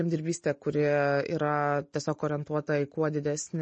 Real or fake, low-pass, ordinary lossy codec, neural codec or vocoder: real; 9.9 kHz; MP3, 32 kbps; none